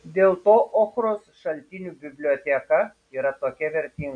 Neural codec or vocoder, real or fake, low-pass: none; real; 9.9 kHz